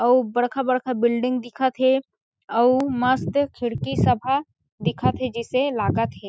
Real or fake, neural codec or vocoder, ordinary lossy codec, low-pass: real; none; none; none